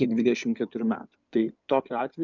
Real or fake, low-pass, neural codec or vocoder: fake; 7.2 kHz; codec, 16 kHz in and 24 kHz out, 2.2 kbps, FireRedTTS-2 codec